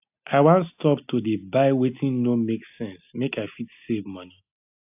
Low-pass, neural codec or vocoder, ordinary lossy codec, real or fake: 3.6 kHz; none; AAC, 32 kbps; real